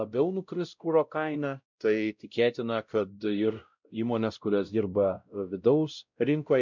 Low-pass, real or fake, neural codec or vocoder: 7.2 kHz; fake; codec, 16 kHz, 0.5 kbps, X-Codec, WavLM features, trained on Multilingual LibriSpeech